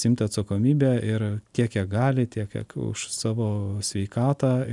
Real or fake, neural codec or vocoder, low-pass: real; none; 10.8 kHz